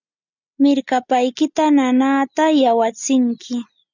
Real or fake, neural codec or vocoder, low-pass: real; none; 7.2 kHz